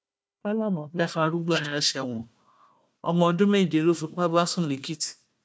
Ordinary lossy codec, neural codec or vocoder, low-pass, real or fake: none; codec, 16 kHz, 1 kbps, FunCodec, trained on Chinese and English, 50 frames a second; none; fake